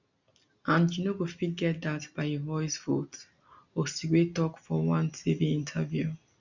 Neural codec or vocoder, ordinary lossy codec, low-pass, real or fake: none; none; 7.2 kHz; real